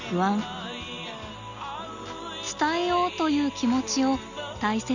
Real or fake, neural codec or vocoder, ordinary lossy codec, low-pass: real; none; none; 7.2 kHz